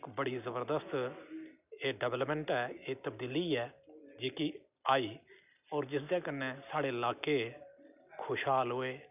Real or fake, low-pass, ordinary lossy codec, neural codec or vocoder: real; 3.6 kHz; none; none